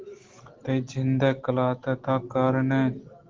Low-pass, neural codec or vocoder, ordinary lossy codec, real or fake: 7.2 kHz; none; Opus, 16 kbps; real